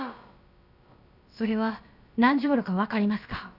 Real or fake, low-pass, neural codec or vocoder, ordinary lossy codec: fake; 5.4 kHz; codec, 16 kHz, about 1 kbps, DyCAST, with the encoder's durations; none